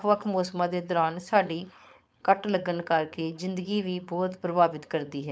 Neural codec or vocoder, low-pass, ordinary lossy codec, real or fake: codec, 16 kHz, 4.8 kbps, FACodec; none; none; fake